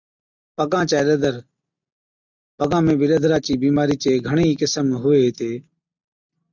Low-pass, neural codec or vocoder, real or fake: 7.2 kHz; none; real